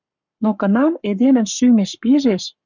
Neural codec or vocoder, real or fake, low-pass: codec, 44.1 kHz, 7.8 kbps, Pupu-Codec; fake; 7.2 kHz